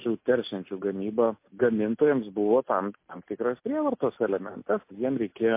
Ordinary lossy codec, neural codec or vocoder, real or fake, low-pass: MP3, 24 kbps; none; real; 3.6 kHz